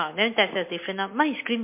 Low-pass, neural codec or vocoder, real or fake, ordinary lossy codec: 3.6 kHz; none; real; MP3, 24 kbps